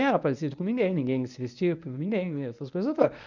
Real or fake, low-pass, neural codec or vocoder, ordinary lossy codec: fake; 7.2 kHz; codec, 24 kHz, 0.9 kbps, WavTokenizer, medium speech release version 1; none